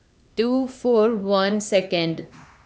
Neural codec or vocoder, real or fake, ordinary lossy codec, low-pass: codec, 16 kHz, 2 kbps, X-Codec, HuBERT features, trained on LibriSpeech; fake; none; none